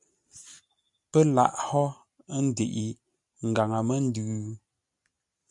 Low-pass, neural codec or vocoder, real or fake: 10.8 kHz; none; real